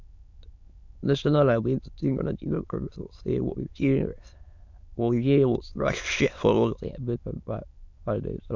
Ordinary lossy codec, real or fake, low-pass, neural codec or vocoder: none; fake; 7.2 kHz; autoencoder, 22.05 kHz, a latent of 192 numbers a frame, VITS, trained on many speakers